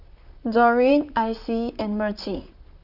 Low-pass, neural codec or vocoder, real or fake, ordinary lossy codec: 5.4 kHz; vocoder, 44.1 kHz, 128 mel bands, Pupu-Vocoder; fake; Opus, 64 kbps